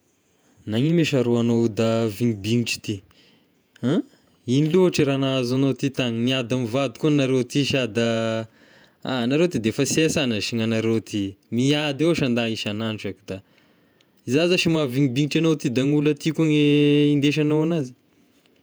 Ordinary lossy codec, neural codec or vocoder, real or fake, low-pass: none; vocoder, 48 kHz, 128 mel bands, Vocos; fake; none